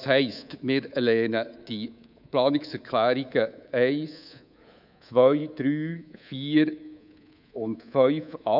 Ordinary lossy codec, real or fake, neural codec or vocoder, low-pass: none; fake; codec, 24 kHz, 3.1 kbps, DualCodec; 5.4 kHz